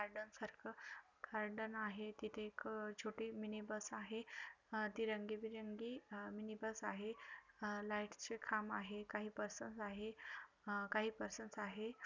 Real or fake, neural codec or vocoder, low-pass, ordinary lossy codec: real; none; 7.2 kHz; none